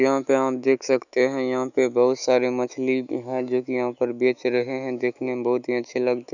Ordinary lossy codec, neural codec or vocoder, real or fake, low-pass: none; autoencoder, 48 kHz, 128 numbers a frame, DAC-VAE, trained on Japanese speech; fake; 7.2 kHz